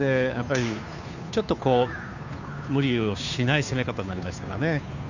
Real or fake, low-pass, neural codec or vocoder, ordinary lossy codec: fake; 7.2 kHz; codec, 16 kHz, 2 kbps, FunCodec, trained on Chinese and English, 25 frames a second; none